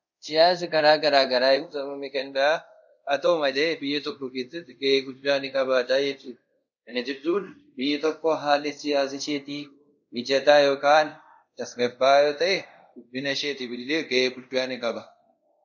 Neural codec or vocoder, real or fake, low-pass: codec, 24 kHz, 0.5 kbps, DualCodec; fake; 7.2 kHz